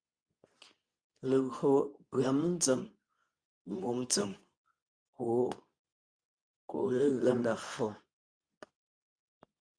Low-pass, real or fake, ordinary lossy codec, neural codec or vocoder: 9.9 kHz; fake; Opus, 64 kbps; codec, 24 kHz, 0.9 kbps, WavTokenizer, medium speech release version 2